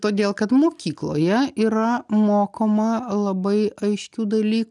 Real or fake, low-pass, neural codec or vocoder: real; 10.8 kHz; none